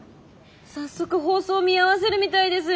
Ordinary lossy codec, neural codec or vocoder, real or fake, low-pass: none; none; real; none